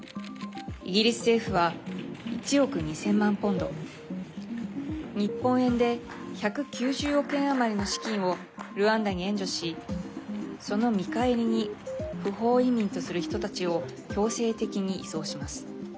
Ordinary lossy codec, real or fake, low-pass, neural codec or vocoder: none; real; none; none